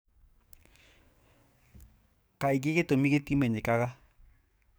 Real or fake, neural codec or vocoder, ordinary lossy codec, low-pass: fake; codec, 44.1 kHz, 7.8 kbps, DAC; none; none